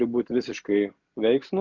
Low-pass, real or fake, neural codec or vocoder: 7.2 kHz; real; none